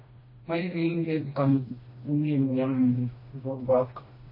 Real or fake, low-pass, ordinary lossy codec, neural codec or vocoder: fake; 5.4 kHz; MP3, 24 kbps; codec, 16 kHz, 1 kbps, FreqCodec, smaller model